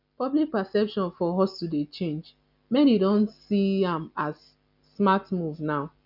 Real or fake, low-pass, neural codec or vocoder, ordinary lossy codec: real; 5.4 kHz; none; none